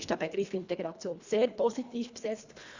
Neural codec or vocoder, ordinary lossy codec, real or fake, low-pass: codec, 24 kHz, 1.5 kbps, HILCodec; Opus, 64 kbps; fake; 7.2 kHz